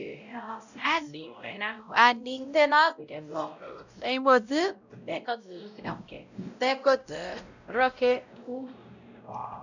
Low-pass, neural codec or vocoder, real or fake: 7.2 kHz; codec, 16 kHz, 0.5 kbps, X-Codec, WavLM features, trained on Multilingual LibriSpeech; fake